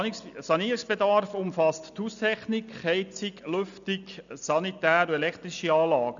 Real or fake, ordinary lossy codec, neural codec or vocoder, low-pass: real; none; none; 7.2 kHz